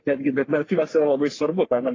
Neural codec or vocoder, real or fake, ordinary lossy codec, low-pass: codec, 44.1 kHz, 3.4 kbps, Pupu-Codec; fake; AAC, 32 kbps; 7.2 kHz